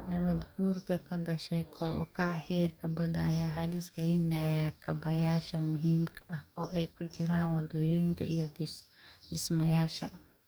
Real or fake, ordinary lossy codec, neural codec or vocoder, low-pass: fake; none; codec, 44.1 kHz, 2.6 kbps, DAC; none